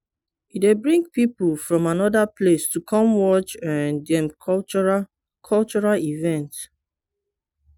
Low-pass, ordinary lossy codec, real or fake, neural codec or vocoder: 19.8 kHz; none; real; none